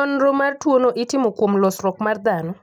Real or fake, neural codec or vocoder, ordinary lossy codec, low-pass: real; none; none; 19.8 kHz